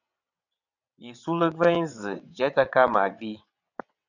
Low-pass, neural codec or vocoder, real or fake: 7.2 kHz; vocoder, 22.05 kHz, 80 mel bands, WaveNeXt; fake